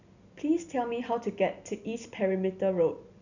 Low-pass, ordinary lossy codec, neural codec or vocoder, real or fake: 7.2 kHz; none; none; real